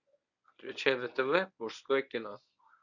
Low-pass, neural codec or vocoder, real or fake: 7.2 kHz; codec, 24 kHz, 0.9 kbps, WavTokenizer, medium speech release version 1; fake